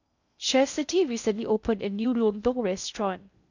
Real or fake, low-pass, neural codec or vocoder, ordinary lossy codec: fake; 7.2 kHz; codec, 16 kHz in and 24 kHz out, 0.6 kbps, FocalCodec, streaming, 2048 codes; none